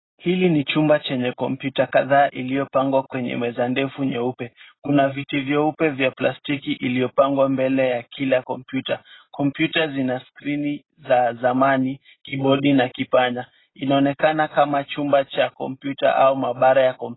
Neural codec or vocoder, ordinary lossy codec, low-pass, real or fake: none; AAC, 16 kbps; 7.2 kHz; real